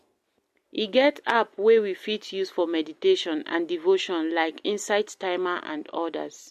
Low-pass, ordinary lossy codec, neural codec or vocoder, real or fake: 14.4 kHz; MP3, 64 kbps; none; real